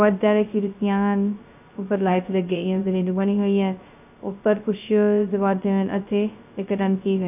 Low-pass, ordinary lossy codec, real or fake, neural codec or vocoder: 3.6 kHz; none; fake; codec, 16 kHz, 0.2 kbps, FocalCodec